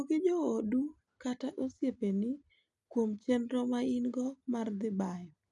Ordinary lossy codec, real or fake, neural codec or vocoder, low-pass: none; real; none; 10.8 kHz